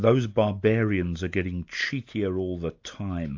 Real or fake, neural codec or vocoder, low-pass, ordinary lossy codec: real; none; 7.2 kHz; AAC, 48 kbps